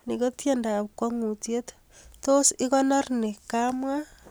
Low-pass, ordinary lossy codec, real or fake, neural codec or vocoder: none; none; real; none